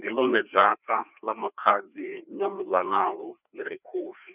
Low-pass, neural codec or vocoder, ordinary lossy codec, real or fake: 3.6 kHz; codec, 16 kHz, 2 kbps, FreqCodec, larger model; none; fake